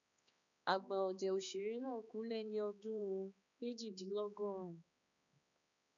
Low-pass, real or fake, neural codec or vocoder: 7.2 kHz; fake; codec, 16 kHz, 2 kbps, X-Codec, HuBERT features, trained on balanced general audio